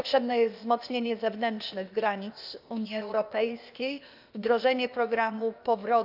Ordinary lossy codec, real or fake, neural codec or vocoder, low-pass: none; fake; codec, 16 kHz, 0.8 kbps, ZipCodec; 5.4 kHz